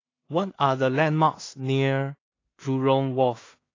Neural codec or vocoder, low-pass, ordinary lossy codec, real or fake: codec, 16 kHz in and 24 kHz out, 0.4 kbps, LongCat-Audio-Codec, two codebook decoder; 7.2 kHz; AAC, 32 kbps; fake